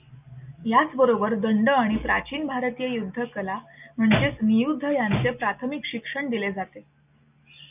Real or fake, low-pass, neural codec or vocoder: real; 3.6 kHz; none